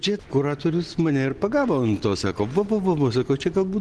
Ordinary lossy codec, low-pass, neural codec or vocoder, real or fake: Opus, 16 kbps; 10.8 kHz; none; real